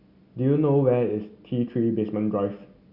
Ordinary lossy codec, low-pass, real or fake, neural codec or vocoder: Opus, 64 kbps; 5.4 kHz; real; none